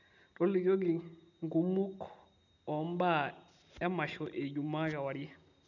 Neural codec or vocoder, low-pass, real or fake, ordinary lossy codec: none; 7.2 kHz; real; none